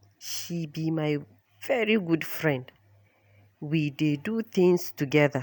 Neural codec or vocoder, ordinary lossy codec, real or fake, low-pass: none; none; real; none